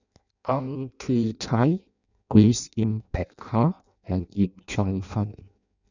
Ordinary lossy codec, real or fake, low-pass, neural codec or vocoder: none; fake; 7.2 kHz; codec, 16 kHz in and 24 kHz out, 0.6 kbps, FireRedTTS-2 codec